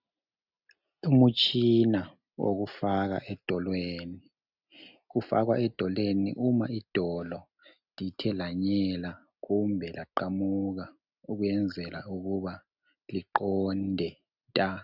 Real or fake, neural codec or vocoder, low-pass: real; none; 5.4 kHz